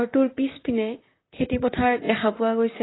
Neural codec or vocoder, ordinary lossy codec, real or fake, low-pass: vocoder, 44.1 kHz, 128 mel bands, Pupu-Vocoder; AAC, 16 kbps; fake; 7.2 kHz